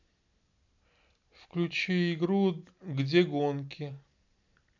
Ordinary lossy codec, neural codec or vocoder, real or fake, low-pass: none; none; real; 7.2 kHz